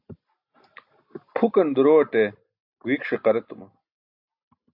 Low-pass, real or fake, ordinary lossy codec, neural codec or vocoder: 5.4 kHz; real; AAC, 48 kbps; none